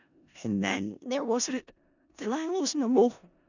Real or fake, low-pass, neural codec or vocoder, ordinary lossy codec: fake; 7.2 kHz; codec, 16 kHz in and 24 kHz out, 0.4 kbps, LongCat-Audio-Codec, four codebook decoder; none